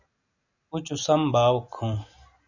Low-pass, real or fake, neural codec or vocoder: 7.2 kHz; real; none